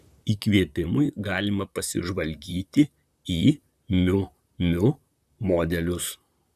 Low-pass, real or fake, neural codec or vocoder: 14.4 kHz; fake; vocoder, 44.1 kHz, 128 mel bands, Pupu-Vocoder